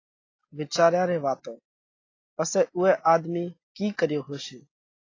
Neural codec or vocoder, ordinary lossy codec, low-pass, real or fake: none; AAC, 32 kbps; 7.2 kHz; real